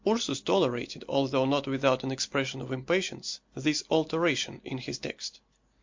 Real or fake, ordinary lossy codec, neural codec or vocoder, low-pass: real; MP3, 48 kbps; none; 7.2 kHz